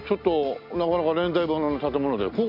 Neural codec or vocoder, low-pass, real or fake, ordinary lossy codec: none; 5.4 kHz; real; none